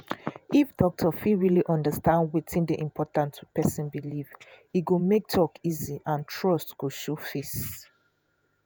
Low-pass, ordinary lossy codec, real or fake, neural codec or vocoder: none; none; fake; vocoder, 48 kHz, 128 mel bands, Vocos